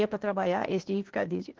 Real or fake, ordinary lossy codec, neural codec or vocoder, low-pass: fake; Opus, 16 kbps; codec, 16 kHz, 0.8 kbps, ZipCodec; 7.2 kHz